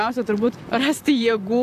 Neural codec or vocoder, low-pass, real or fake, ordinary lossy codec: vocoder, 44.1 kHz, 128 mel bands, Pupu-Vocoder; 14.4 kHz; fake; AAC, 96 kbps